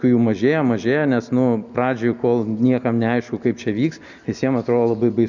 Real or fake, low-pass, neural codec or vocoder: real; 7.2 kHz; none